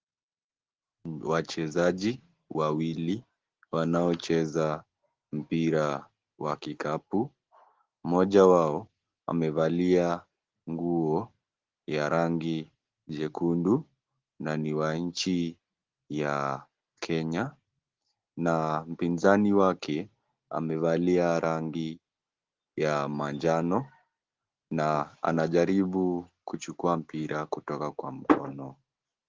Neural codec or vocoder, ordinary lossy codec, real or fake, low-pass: none; Opus, 16 kbps; real; 7.2 kHz